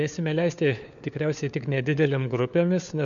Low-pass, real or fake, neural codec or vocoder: 7.2 kHz; fake; codec, 16 kHz, 4 kbps, FunCodec, trained on LibriTTS, 50 frames a second